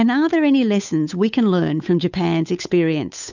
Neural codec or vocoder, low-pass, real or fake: codec, 16 kHz, 8 kbps, FunCodec, trained on Chinese and English, 25 frames a second; 7.2 kHz; fake